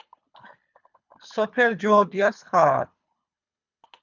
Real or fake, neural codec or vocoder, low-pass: fake; codec, 24 kHz, 3 kbps, HILCodec; 7.2 kHz